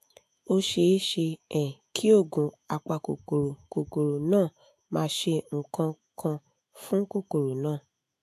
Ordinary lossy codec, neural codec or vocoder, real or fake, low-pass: AAC, 64 kbps; autoencoder, 48 kHz, 128 numbers a frame, DAC-VAE, trained on Japanese speech; fake; 14.4 kHz